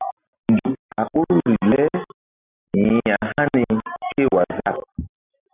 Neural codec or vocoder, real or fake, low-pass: none; real; 3.6 kHz